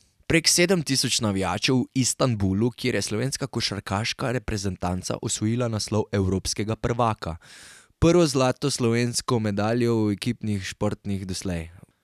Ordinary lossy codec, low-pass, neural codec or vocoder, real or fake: none; 14.4 kHz; none; real